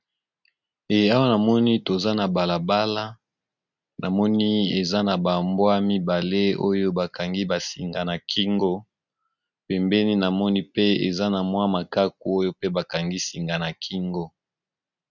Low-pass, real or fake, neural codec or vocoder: 7.2 kHz; real; none